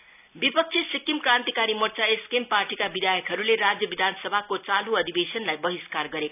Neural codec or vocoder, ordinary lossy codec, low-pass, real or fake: none; none; 3.6 kHz; real